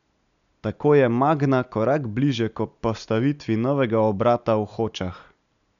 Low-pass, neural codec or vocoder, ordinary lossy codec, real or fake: 7.2 kHz; none; none; real